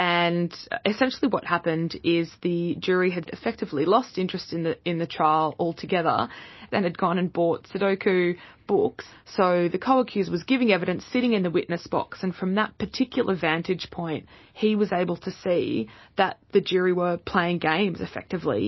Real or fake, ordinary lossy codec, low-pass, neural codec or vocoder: real; MP3, 24 kbps; 7.2 kHz; none